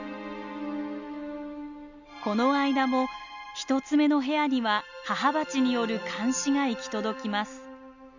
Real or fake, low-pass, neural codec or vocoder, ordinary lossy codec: real; 7.2 kHz; none; none